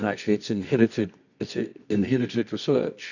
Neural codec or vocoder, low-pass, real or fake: codec, 24 kHz, 0.9 kbps, WavTokenizer, medium music audio release; 7.2 kHz; fake